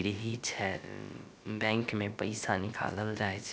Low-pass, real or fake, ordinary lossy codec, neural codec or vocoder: none; fake; none; codec, 16 kHz, about 1 kbps, DyCAST, with the encoder's durations